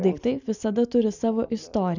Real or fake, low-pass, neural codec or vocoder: real; 7.2 kHz; none